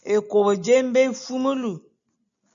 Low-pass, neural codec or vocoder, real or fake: 7.2 kHz; none; real